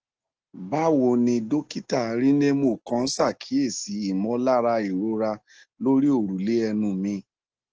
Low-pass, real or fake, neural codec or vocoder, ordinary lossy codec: 7.2 kHz; real; none; Opus, 16 kbps